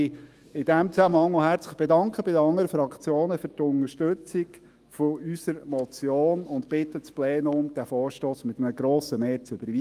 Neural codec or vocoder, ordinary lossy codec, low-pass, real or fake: autoencoder, 48 kHz, 128 numbers a frame, DAC-VAE, trained on Japanese speech; Opus, 32 kbps; 14.4 kHz; fake